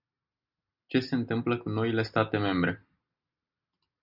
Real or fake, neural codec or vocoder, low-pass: real; none; 5.4 kHz